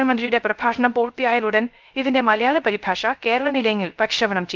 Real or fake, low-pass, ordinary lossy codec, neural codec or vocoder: fake; 7.2 kHz; Opus, 32 kbps; codec, 16 kHz, 0.3 kbps, FocalCodec